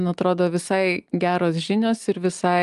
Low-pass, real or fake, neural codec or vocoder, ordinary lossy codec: 10.8 kHz; real; none; Opus, 32 kbps